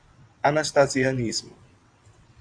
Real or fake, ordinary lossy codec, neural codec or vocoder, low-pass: fake; AAC, 64 kbps; vocoder, 22.05 kHz, 80 mel bands, WaveNeXt; 9.9 kHz